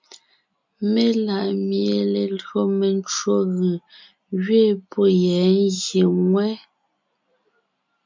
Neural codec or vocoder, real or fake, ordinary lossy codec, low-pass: none; real; MP3, 64 kbps; 7.2 kHz